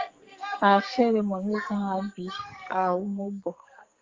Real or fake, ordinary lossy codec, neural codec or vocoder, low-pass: fake; Opus, 32 kbps; codec, 44.1 kHz, 2.6 kbps, SNAC; 7.2 kHz